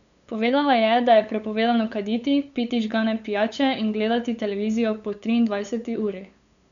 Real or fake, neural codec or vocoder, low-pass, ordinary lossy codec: fake; codec, 16 kHz, 8 kbps, FunCodec, trained on LibriTTS, 25 frames a second; 7.2 kHz; none